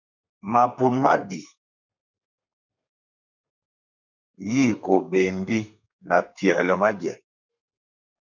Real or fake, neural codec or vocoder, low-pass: fake; codec, 32 kHz, 1.9 kbps, SNAC; 7.2 kHz